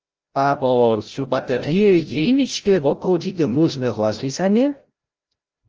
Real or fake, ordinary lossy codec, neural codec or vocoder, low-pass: fake; Opus, 24 kbps; codec, 16 kHz, 0.5 kbps, FreqCodec, larger model; 7.2 kHz